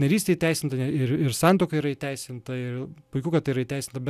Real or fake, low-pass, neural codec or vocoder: real; 14.4 kHz; none